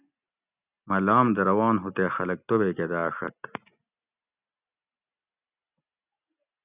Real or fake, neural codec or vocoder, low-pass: real; none; 3.6 kHz